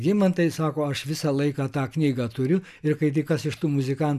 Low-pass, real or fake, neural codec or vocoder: 14.4 kHz; real; none